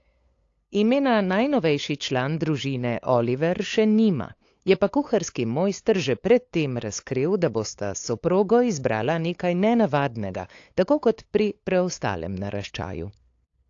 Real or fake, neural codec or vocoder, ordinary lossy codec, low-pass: fake; codec, 16 kHz, 8 kbps, FunCodec, trained on LibriTTS, 25 frames a second; AAC, 48 kbps; 7.2 kHz